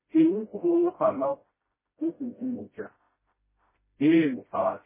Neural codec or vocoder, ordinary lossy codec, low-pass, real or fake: codec, 16 kHz, 0.5 kbps, FreqCodec, smaller model; MP3, 16 kbps; 3.6 kHz; fake